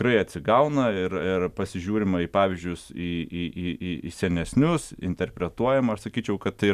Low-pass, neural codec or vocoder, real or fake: 14.4 kHz; none; real